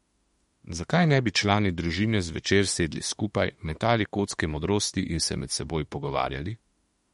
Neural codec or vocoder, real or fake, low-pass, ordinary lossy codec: autoencoder, 48 kHz, 32 numbers a frame, DAC-VAE, trained on Japanese speech; fake; 19.8 kHz; MP3, 48 kbps